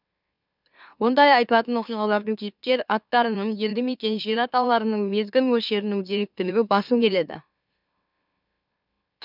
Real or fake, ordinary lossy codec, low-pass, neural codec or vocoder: fake; none; 5.4 kHz; autoencoder, 44.1 kHz, a latent of 192 numbers a frame, MeloTTS